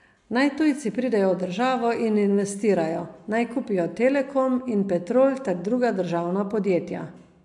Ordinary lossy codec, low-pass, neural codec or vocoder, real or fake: AAC, 64 kbps; 10.8 kHz; none; real